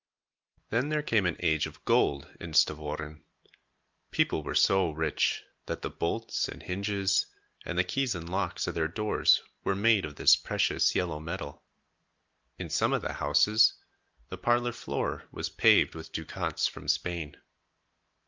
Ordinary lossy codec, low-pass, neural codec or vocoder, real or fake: Opus, 32 kbps; 7.2 kHz; none; real